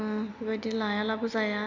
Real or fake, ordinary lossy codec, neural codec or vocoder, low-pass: real; MP3, 64 kbps; none; 7.2 kHz